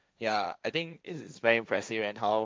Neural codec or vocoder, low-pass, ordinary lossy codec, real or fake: codec, 16 kHz, 1.1 kbps, Voila-Tokenizer; none; none; fake